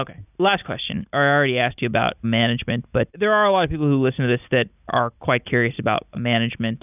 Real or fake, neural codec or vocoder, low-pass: real; none; 3.6 kHz